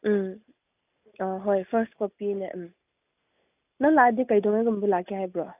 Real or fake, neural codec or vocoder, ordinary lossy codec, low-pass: real; none; none; 3.6 kHz